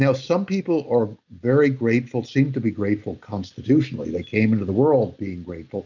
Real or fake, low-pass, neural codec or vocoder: real; 7.2 kHz; none